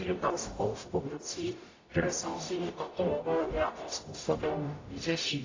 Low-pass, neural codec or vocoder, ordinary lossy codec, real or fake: 7.2 kHz; codec, 44.1 kHz, 0.9 kbps, DAC; AAC, 32 kbps; fake